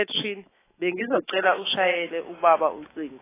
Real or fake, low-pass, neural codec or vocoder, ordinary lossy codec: fake; 3.6 kHz; autoencoder, 48 kHz, 128 numbers a frame, DAC-VAE, trained on Japanese speech; AAC, 16 kbps